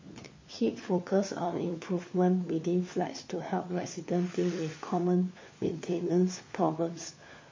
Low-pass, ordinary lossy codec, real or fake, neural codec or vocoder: 7.2 kHz; MP3, 32 kbps; fake; codec, 16 kHz, 4 kbps, FunCodec, trained on LibriTTS, 50 frames a second